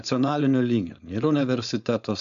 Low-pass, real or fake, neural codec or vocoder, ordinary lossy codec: 7.2 kHz; fake; codec, 16 kHz, 4.8 kbps, FACodec; MP3, 96 kbps